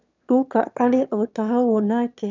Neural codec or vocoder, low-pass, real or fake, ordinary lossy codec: autoencoder, 22.05 kHz, a latent of 192 numbers a frame, VITS, trained on one speaker; 7.2 kHz; fake; none